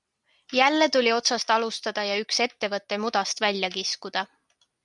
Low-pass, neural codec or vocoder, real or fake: 10.8 kHz; none; real